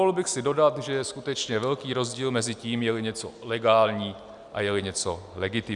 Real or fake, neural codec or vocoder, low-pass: real; none; 10.8 kHz